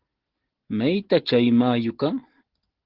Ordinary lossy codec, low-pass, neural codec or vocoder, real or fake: Opus, 16 kbps; 5.4 kHz; none; real